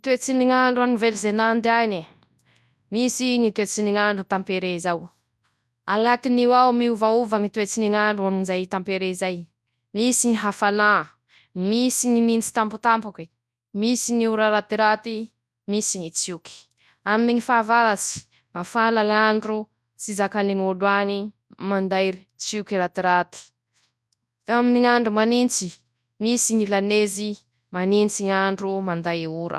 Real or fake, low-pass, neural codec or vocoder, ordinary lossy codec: fake; none; codec, 24 kHz, 0.9 kbps, WavTokenizer, large speech release; none